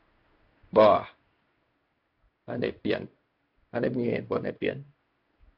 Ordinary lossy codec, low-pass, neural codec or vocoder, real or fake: AAC, 48 kbps; 5.4 kHz; codec, 16 kHz in and 24 kHz out, 1 kbps, XY-Tokenizer; fake